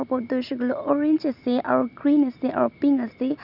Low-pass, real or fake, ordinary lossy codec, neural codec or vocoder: 5.4 kHz; real; none; none